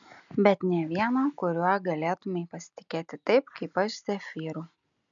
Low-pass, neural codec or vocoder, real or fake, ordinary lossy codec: 7.2 kHz; none; real; MP3, 96 kbps